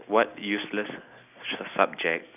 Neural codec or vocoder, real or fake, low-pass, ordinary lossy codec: none; real; 3.6 kHz; none